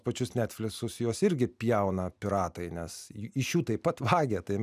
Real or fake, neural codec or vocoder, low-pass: real; none; 14.4 kHz